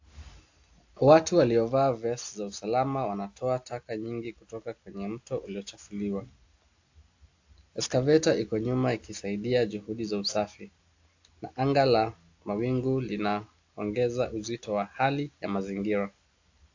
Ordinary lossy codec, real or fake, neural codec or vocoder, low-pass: AAC, 48 kbps; real; none; 7.2 kHz